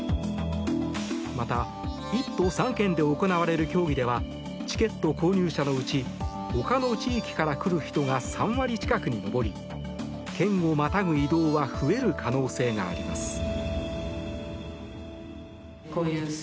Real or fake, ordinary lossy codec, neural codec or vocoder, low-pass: real; none; none; none